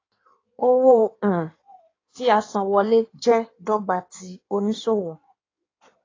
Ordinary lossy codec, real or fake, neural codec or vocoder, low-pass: AAC, 32 kbps; fake; codec, 16 kHz in and 24 kHz out, 1.1 kbps, FireRedTTS-2 codec; 7.2 kHz